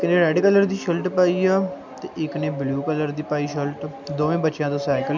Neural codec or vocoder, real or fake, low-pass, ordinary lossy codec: none; real; 7.2 kHz; none